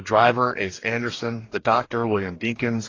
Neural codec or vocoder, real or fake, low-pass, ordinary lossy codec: codec, 44.1 kHz, 2.6 kbps, DAC; fake; 7.2 kHz; AAC, 32 kbps